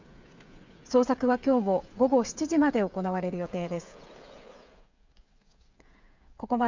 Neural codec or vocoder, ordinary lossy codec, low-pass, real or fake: codec, 16 kHz, 8 kbps, FreqCodec, smaller model; MP3, 64 kbps; 7.2 kHz; fake